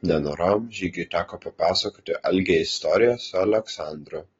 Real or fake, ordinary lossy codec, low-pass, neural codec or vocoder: real; AAC, 24 kbps; 7.2 kHz; none